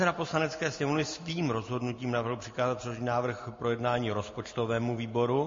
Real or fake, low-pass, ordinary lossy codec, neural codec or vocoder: real; 7.2 kHz; MP3, 32 kbps; none